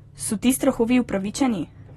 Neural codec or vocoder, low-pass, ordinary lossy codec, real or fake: none; 19.8 kHz; AAC, 32 kbps; real